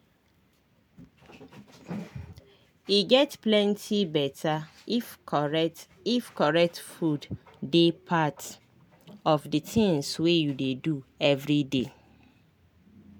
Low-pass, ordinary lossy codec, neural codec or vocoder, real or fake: none; none; none; real